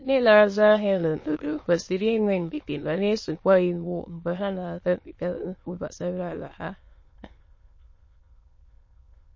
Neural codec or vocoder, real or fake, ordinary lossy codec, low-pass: autoencoder, 22.05 kHz, a latent of 192 numbers a frame, VITS, trained on many speakers; fake; MP3, 32 kbps; 7.2 kHz